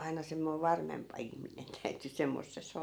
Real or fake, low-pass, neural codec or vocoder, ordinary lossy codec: real; none; none; none